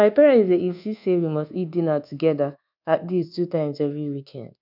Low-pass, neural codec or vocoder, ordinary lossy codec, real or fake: 5.4 kHz; codec, 24 kHz, 1.2 kbps, DualCodec; none; fake